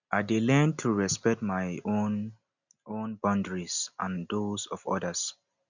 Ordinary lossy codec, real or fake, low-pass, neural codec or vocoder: none; real; 7.2 kHz; none